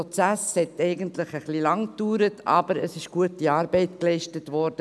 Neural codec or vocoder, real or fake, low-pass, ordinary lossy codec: none; real; none; none